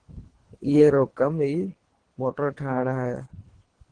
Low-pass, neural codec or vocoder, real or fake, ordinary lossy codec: 9.9 kHz; codec, 24 kHz, 3 kbps, HILCodec; fake; Opus, 16 kbps